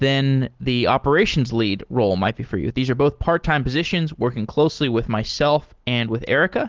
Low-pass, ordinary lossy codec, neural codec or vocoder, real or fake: 7.2 kHz; Opus, 16 kbps; none; real